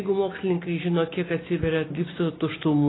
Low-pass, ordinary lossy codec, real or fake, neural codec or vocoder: 7.2 kHz; AAC, 16 kbps; real; none